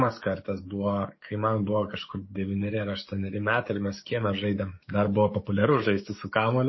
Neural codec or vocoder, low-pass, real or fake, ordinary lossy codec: codec, 44.1 kHz, 7.8 kbps, DAC; 7.2 kHz; fake; MP3, 24 kbps